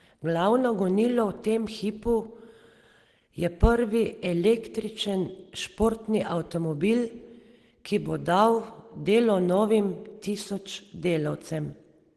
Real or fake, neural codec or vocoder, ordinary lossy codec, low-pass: real; none; Opus, 16 kbps; 10.8 kHz